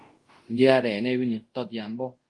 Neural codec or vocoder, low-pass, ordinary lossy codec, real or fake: codec, 24 kHz, 0.5 kbps, DualCodec; 10.8 kHz; Opus, 24 kbps; fake